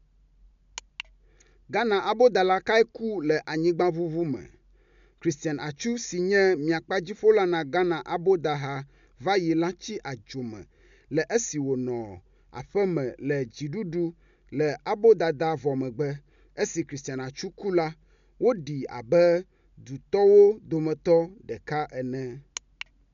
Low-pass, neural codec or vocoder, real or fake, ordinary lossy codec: 7.2 kHz; none; real; MP3, 64 kbps